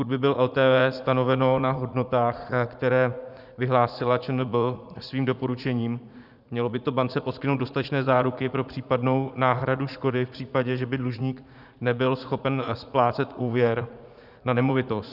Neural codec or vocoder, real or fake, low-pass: vocoder, 44.1 kHz, 80 mel bands, Vocos; fake; 5.4 kHz